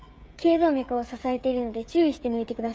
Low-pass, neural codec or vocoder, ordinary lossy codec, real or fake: none; codec, 16 kHz, 16 kbps, FreqCodec, smaller model; none; fake